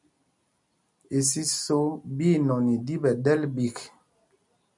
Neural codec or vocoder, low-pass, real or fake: none; 10.8 kHz; real